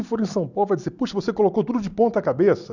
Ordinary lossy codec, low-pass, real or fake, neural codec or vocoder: none; 7.2 kHz; real; none